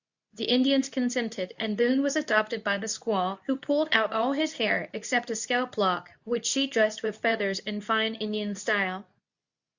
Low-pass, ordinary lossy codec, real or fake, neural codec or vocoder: 7.2 kHz; Opus, 64 kbps; fake; codec, 24 kHz, 0.9 kbps, WavTokenizer, medium speech release version 2